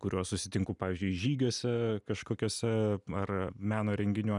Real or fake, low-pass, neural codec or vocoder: real; 10.8 kHz; none